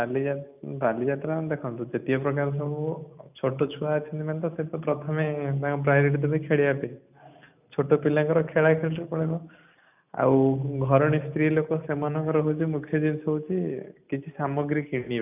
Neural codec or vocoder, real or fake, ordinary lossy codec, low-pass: none; real; none; 3.6 kHz